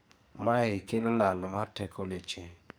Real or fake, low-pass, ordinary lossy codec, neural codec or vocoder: fake; none; none; codec, 44.1 kHz, 2.6 kbps, SNAC